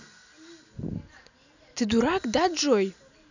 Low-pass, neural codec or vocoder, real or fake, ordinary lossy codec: 7.2 kHz; none; real; none